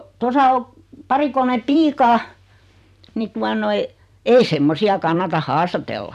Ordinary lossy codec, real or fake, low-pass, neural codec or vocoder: none; fake; 14.4 kHz; vocoder, 44.1 kHz, 128 mel bands every 256 samples, BigVGAN v2